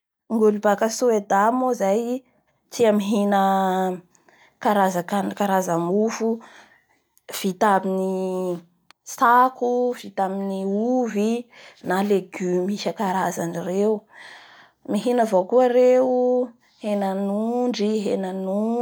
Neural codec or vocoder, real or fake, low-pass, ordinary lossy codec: none; real; none; none